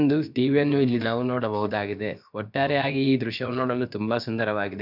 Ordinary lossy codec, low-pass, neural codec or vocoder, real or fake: none; 5.4 kHz; codec, 16 kHz, about 1 kbps, DyCAST, with the encoder's durations; fake